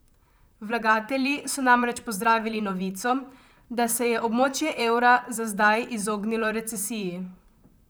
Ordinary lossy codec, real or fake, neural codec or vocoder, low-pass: none; fake; vocoder, 44.1 kHz, 128 mel bands, Pupu-Vocoder; none